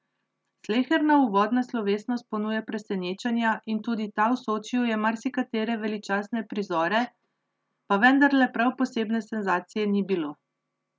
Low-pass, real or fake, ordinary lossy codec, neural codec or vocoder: none; real; none; none